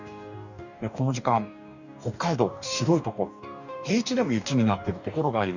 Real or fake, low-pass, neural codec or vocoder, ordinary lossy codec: fake; 7.2 kHz; codec, 44.1 kHz, 2.6 kbps, DAC; none